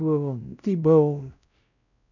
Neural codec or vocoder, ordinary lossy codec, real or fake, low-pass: codec, 16 kHz, 0.5 kbps, X-Codec, WavLM features, trained on Multilingual LibriSpeech; none; fake; 7.2 kHz